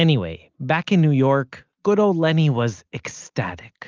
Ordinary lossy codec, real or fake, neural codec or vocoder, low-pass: Opus, 24 kbps; real; none; 7.2 kHz